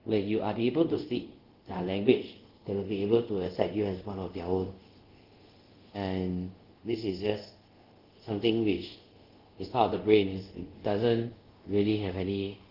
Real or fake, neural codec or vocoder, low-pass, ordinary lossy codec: fake; codec, 24 kHz, 0.5 kbps, DualCodec; 5.4 kHz; Opus, 16 kbps